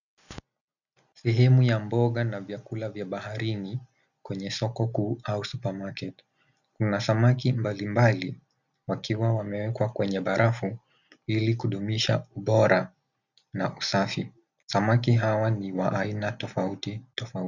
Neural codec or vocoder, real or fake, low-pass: none; real; 7.2 kHz